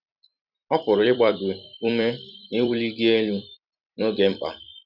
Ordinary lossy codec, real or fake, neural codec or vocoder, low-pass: none; fake; vocoder, 44.1 kHz, 128 mel bands every 256 samples, BigVGAN v2; 5.4 kHz